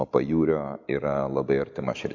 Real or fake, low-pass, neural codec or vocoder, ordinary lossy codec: real; 7.2 kHz; none; MP3, 64 kbps